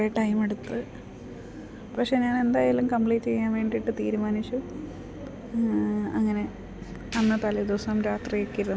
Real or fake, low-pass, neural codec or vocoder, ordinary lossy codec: real; none; none; none